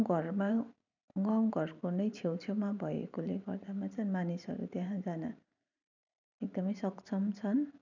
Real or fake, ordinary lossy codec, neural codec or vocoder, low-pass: fake; none; vocoder, 44.1 kHz, 128 mel bands every 512 samples, BigVGAN v2; 7.2 kHz